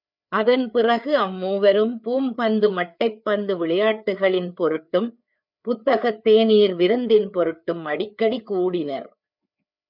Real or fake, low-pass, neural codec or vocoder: fake; 5.4 kHz; codec, 16 kHz, 4 kbps, FreqCodec, larger model